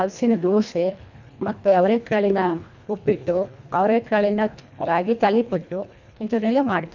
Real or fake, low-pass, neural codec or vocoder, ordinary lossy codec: fake; 7.2 kHz; codec, 24 kHz, 1.5 kbps, HILCodec; none